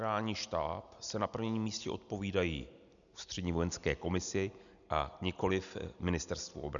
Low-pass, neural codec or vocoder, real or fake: 7.2 kHz; none; real